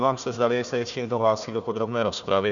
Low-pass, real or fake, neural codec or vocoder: 7.2 kHz; fake; codec, 16 kHz, 1 kbps, FunCodec, trained on Chinese and English, 50 frames a second